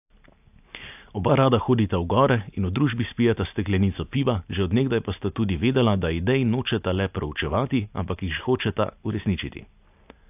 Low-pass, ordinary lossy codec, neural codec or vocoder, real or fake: 3.6 kHz; AAC, 32 kbps; none; real